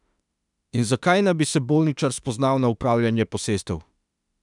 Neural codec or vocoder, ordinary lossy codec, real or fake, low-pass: autoencoder, 48 kHz, 32 numbers a frame, DAC-VAE, trained on Japanese speech; none; fake; 10.8 kHz